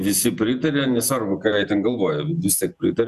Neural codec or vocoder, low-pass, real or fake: vocoder, 48 kHz, 128 mel bands, Vocos; 14.4 kHz; fake